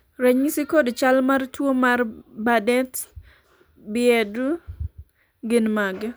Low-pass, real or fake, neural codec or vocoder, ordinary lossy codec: none; real; none; none